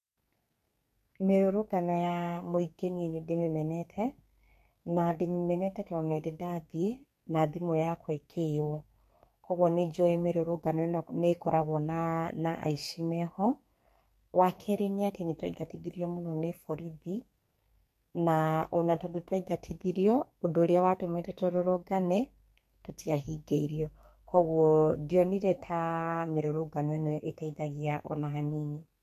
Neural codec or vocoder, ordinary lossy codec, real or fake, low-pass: codec, 44.1 kHz, 2.6 kbps, SNAC; MP3, 64 kbps; fake; 14.4 kHz